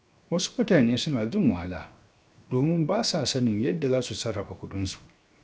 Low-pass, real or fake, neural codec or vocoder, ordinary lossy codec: none; fake; codec, 16 kHz, 0.7 kbps, FocalCodec; none